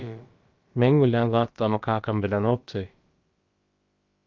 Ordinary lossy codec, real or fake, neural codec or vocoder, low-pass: Opus, 24 kbps; fake; codec, 16 kHz, about 1 kbps, DyCAST, with the encoder's durations; 7.2 kHz